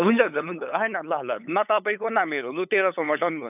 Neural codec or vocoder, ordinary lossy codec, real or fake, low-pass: codec, 16 kHz, 8 kbps, FunCodec, trained on LibriTTS, 25 frames a second; none; fake; 3.6 kHz